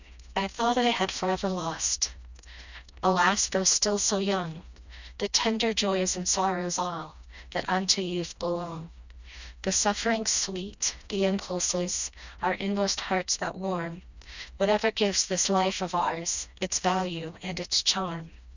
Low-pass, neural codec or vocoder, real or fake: 7.2 kHz; codec, 16 kHz, 1 kbps, FreqCodec, smaller model; fake